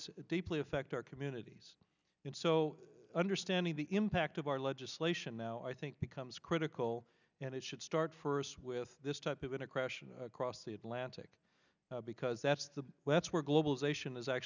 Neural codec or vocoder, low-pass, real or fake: none; 7.2 kHz; real